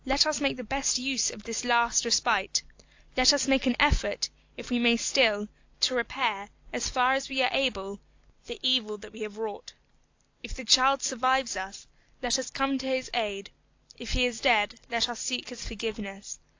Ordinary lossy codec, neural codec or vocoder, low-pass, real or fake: AAC, 48 kbps; none; 7.2 kHz; real